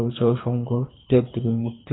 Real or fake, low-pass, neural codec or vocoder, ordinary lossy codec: fake; 7.2 kHz; codec, 24 kHz, 3 kbps, HILCodec; AAC, 16 kbps